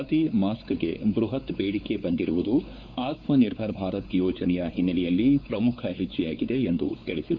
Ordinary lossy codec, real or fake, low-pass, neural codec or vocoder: none; fake; 7.2 kHz; codec, 16 kHz, 4 kbps, X-Codec, WavLM features, trained on Multilingual LibriSpeech